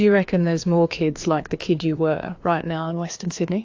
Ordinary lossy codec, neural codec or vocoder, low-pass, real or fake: AAC, 48 kbps; codec, 16 kHz, 2 kbps, FreqCodec, larger model; 7.2 kHz; fake